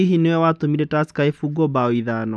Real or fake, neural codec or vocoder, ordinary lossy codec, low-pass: real; none; none; none